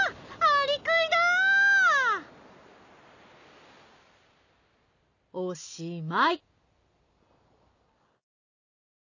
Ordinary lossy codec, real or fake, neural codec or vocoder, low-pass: none; real; none; 7.2 kHz